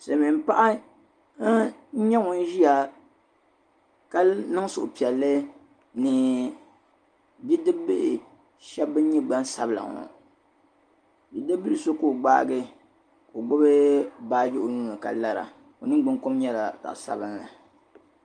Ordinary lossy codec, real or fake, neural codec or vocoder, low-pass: Opus, 32 kbps; real; none; 9.9 kHz